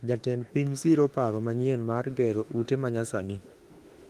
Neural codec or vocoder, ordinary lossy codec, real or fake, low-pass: autoencoder, 48 kHz, 32 numbers a frame, DAC-VAE, trained on Japanese speech; Opus, 24 kbps; fake; 14.4 kHz